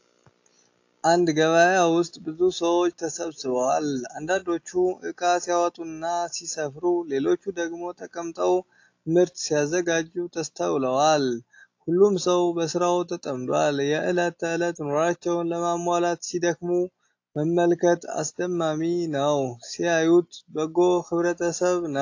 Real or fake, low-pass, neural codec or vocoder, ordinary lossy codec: real; 7.2 kHz; none; AAC, 48 kbps